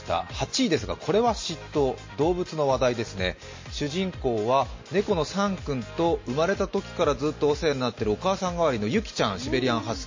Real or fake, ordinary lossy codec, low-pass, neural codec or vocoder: real; MP3, 32 kbps; 7.2 kHz; none